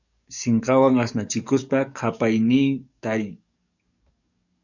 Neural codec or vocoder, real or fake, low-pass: codec, 44.1 kHz, 7.8 kbps, DAC; fake; 7.2 kHz